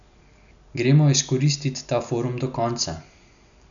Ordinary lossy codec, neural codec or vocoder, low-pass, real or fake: none; none; 7.2 kHz; real